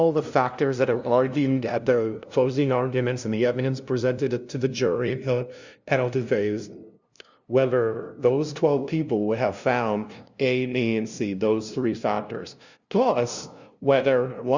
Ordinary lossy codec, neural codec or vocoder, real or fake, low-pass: Opus, 64 kbps; codec, 16 kHz, 0.5 kbps, FunCodec, trained on LibriTTS, 25 frames a second; fake; 7.2 kHz